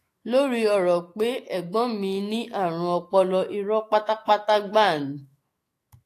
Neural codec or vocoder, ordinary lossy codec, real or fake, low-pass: autoencoder, 48 kHz, 128 numbers a frame, DAC-VAE, trained on Japanese speech; AAC, 48 kbps; fake; 14.4 kHz